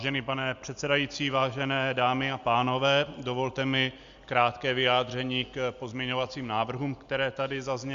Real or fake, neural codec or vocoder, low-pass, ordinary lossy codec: real; none; 7.2 kHz; Opus, 64 kbps